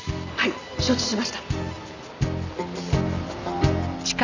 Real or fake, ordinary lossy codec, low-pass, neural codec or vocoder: real; none; 7.2 kHz; none